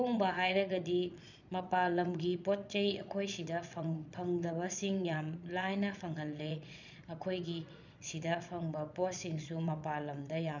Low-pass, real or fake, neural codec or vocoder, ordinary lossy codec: 7.2 kHz; fake; vocoder, 22.05 kHz, 80 mel bands, Vocos; none